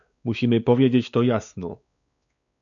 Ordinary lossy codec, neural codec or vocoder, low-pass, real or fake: MP3, 96 kbps; codec, 16 kHz, 4 kbps, X-Codec, WavLM features, trained on Multilingual LibriSpeech; 7.2 kHz; fake